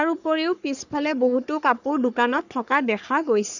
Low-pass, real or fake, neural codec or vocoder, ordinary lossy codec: 7.2 kHz; fake; codec, 44.1 kHz, 3.4 kbps, Pupu-Codec; none